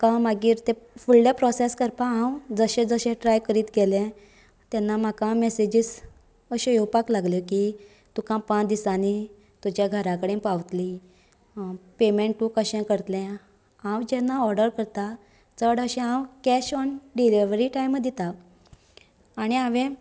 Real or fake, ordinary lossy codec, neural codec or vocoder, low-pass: real; none; none; none